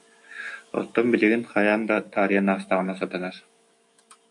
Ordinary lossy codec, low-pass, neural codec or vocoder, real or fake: MP3, 48 kbps; 10.8 kHz; autoencoder, 48 kHz, 128 numbers a frame, DAC-VAE, trained on Japanese speech; fake